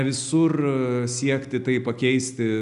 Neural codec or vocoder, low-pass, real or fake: none; 10.8 kHz; real